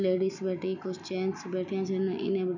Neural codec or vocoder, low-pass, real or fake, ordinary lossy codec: none; 7.2 kHz; real; none